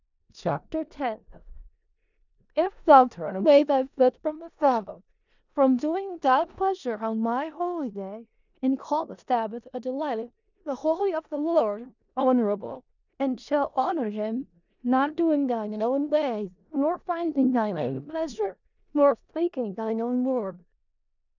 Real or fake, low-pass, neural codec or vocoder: fake; 7.2 kHz; codec, 16 kHz in and 24 kHz out, 0.4 kbps, LongCat-Audio-Codec, four codebook decoder